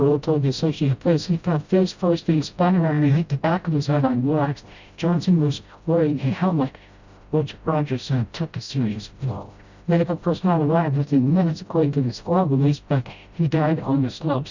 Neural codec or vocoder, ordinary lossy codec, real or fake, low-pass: codec, 16 kHz, 0.5 kbps, FreqCodec, smaller model; Opus, 64 kbps; fake; 7.2 kHz